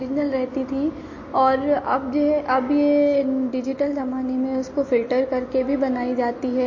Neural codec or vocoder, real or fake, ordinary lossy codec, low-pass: none; real; MP3, 32 kbps; 7.2 kHz